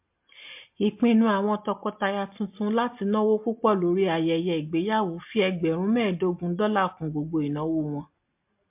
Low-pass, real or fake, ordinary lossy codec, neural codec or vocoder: 3.6 kHz; real; MP3, 32 kbps; none